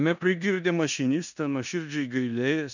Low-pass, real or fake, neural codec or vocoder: 7.2 kHz; fake; codec, 16 kHz in and 24 kHz out, 0.9 kbps, LongCat-Audio-Codec, four codebook decoder